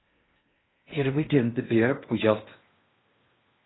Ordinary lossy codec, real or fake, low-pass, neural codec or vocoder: AAC, 16 kbps; fake; 7.2 kHz; codec, 16 kHz in and 24 kHz out, 0.6 kbps, FocalCodec, streaming, 4096 codes